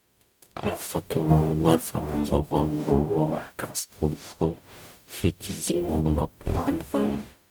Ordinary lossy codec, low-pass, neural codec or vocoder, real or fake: none; none; codec, 44.1 kHz, 0.9 kbps, DAC; fake